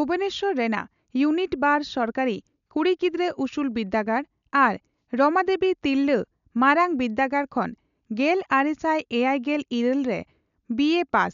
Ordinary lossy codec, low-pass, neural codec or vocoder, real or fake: none; 7.2 kHz; none; real